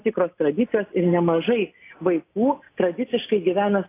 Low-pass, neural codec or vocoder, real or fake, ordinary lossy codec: 3.6 kHz; none; real; AAC, 24 kbps